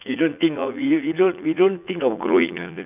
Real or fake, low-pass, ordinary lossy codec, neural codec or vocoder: fake; 3.6 kHz; none; vocoder, 44.1 kHz, 80 mel bands, Vocos